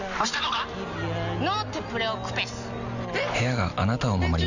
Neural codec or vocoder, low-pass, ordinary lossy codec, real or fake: none; 7.2 kHz; none; real